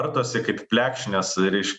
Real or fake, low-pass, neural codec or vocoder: real; 10.8 kHz; none